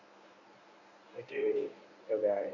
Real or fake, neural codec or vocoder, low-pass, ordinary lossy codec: fake; codec, 24 kHz, 0.9 kbps, WavTokenizer, medium speech release version 1; 7.2 kHz; none